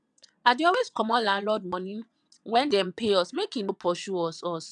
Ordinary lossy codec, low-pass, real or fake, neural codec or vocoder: none; 9.9 kHz; fake; vocoder, 22.05 kHz, 80 mel bands, WaveNeXt